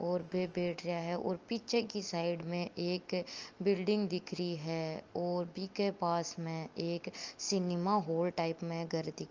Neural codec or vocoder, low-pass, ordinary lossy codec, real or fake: none; 7.2 kHz; Opus, 24 kbps; real